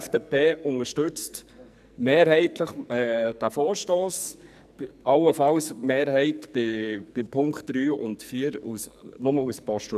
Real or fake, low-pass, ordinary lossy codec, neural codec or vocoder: fake; 14.4 kHz; none; codec, 44.1 kHz, 2.6 kbps, SNAC